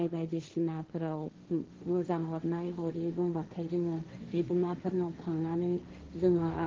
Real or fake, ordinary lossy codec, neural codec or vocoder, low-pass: fake; Opus, 16 kbps; codec, 16 kHz, 1.1 kbps, Voila-Tokenizer; 7.2 kHz